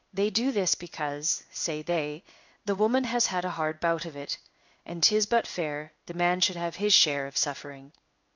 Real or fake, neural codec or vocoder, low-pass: fake; codec, 16 kHz in and 24 kHz out, 1 kbps, XY-Tokenizer; 7.2 kHz